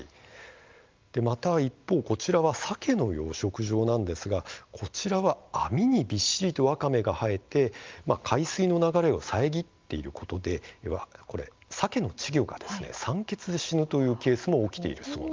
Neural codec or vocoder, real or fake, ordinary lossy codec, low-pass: none; real; Opus, 32 kbps; 7.2 kHz